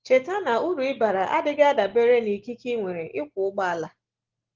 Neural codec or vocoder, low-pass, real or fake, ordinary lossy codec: none; 7.2 kHz; real; Opus, 16 kbps